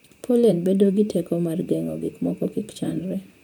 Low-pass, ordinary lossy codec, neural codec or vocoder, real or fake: none; none; vocoder, 44.1 kHz, 128 mel bands, Pupu-Vocoder; fake